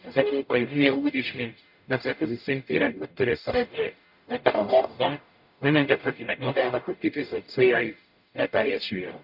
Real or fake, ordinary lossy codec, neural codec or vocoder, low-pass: fake; none; codec, 44.1 kHz, 0.9 kbps, DAC; 5.4 kHz